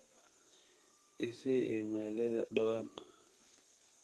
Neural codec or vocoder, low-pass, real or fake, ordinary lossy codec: codec, 32 kHz, 1.9 kbps, SNAC; 14.4 kHz; fake; Opus, 24 kbps